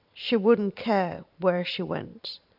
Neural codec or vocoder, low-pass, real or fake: vocoder, 44.1 kHz, 128 mel bands every 512 samples, BigVGAN v2; 5.4 kHz; fake